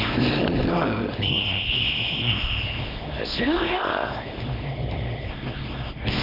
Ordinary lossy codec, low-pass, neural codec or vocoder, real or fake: none; 5.4 kHz; codec, 24 kHz, 0.9 kbps, WavTokenizer, small release; fake